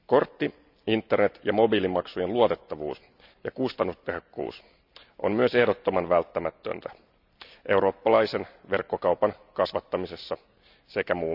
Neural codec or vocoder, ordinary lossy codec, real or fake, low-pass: none; none; real; 5.4 kHz